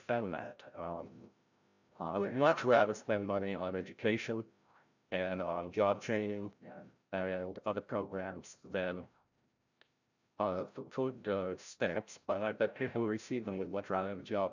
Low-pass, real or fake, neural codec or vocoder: 7.2 kHz; fake; codec, 16 kHz, 0.5 kbps, FreqCodec, larger model